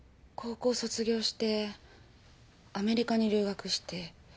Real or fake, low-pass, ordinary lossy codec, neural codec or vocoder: real; none; none; none